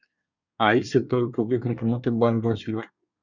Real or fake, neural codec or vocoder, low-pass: fake; codec, 24 kHz, 1 kbps, SNAC; 7.2 kHz